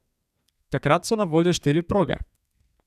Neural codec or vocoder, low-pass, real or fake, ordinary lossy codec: codec, 32 kHz, 1.9 kbps, SNAC; 14.4 kHz; fake; none